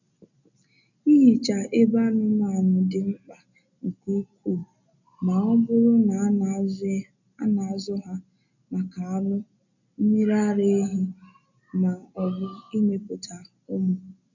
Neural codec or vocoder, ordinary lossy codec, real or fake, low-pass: none; none; real; 7.2 kHz